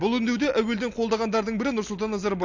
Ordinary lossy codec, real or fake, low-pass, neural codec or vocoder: AAC, 48 kbps; real; 7.2 kHz; none